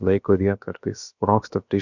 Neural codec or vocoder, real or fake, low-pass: codec, 16 kHz, about 1 kbps, DyCAST, with the encoder's durations; fake; 7.2 kHz